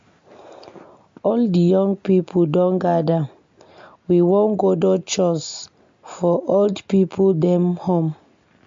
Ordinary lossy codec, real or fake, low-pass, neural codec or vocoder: MP3, 48 kbps; real; 7.2 kHz; none